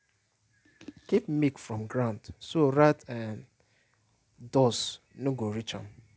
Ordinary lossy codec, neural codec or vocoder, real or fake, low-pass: none; none; real; none